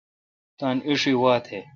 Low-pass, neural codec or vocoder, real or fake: 7.2 kHz; none; real